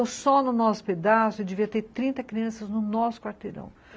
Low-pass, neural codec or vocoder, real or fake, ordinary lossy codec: none; none; real; none